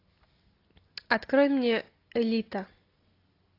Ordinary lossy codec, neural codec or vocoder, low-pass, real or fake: AAC, 32 kbps; none; 5.4 kHz; real